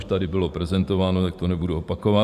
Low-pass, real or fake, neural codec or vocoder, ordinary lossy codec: 14.4 kHz; fake; vocoder, 44.1 kHz, 128 mel bands every 512 samples, BigVGAN v2; Opus, 64 kbps